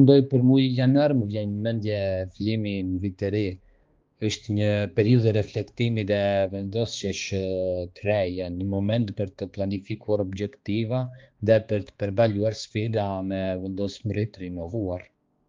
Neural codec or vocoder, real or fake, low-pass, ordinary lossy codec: codec, 16 kHz, 2 kbps, X-Codec, HuBERT features, trained on balanced general audio; fake; 7.2 kHz; Opus, 32 kbps